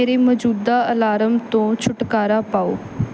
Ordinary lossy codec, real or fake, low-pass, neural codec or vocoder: none; real; none; none